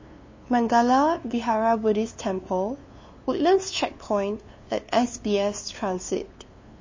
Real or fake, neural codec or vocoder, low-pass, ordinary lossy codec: fake; codec, 16 kHz, 2 kbps, FunCodec, trained on LibriTTS, 25 frames a second; 7.2 kHz; MP3, 32 kbps